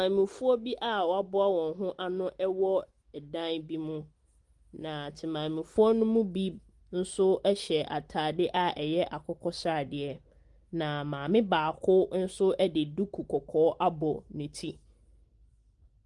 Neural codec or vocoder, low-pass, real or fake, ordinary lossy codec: none; 10.8 kHz; real; Opus, 24 kbps